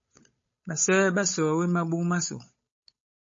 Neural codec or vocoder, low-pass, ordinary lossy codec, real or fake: codec, 16 kHz, 8 kbps, FunCodec, trained on Chinese and English, 25 frames a second; 7.2 kHz; MP3, 32 kbps; fake